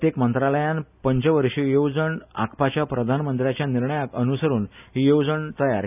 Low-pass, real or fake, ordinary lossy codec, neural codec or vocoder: 3.6 kHz; real; none; none